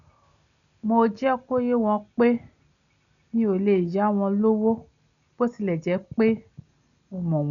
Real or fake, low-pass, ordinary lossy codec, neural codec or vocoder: real; 7.2 kHz; none; none